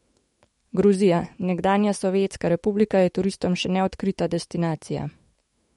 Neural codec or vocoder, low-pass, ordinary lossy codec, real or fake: autoencoder, 48 kHz, 128 numbers a frame, DAC-VAE, trained on Japanese speech; 19.8 kHz; MP3, 48 kbps; fake